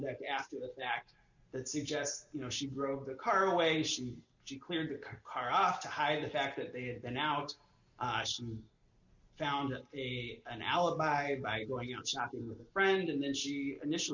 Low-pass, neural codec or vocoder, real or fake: 7.2 kHz; none; real